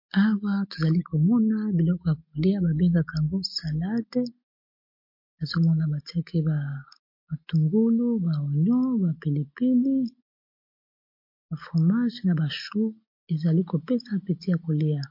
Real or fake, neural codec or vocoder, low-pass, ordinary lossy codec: real; none; 5.4 kHz; MP3, 32 kbps